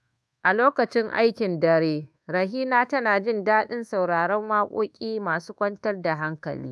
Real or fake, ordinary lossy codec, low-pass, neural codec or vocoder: fake; none; none; codec, 24 kHz, 1.2 kbps, DualCodec